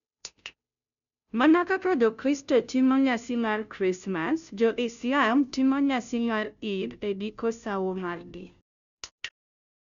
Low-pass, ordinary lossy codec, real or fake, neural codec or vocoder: 7.2 kHz; none; fake; codec, 16 kHz, 0.5 kbps, FunCodec, trained on Chinese and English, 25 frames a second